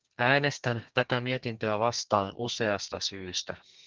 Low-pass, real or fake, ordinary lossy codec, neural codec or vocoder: 7.2 kHz; fake; Opus, 32 kbps; codec, 32 kHz, 1.9 kbps, SNAC